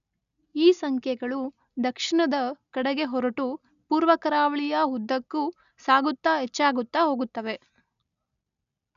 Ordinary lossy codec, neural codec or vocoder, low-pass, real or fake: none; none; 7.2 kHz; real